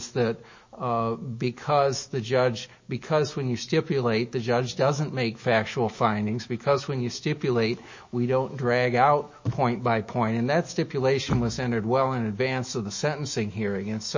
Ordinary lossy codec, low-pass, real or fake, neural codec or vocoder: MP3, 32 kbps; 7.2 kHz; fake; autoencoder, 48 kHz, 128 numbers a frame, DAC-VAE, trained on Japanese speech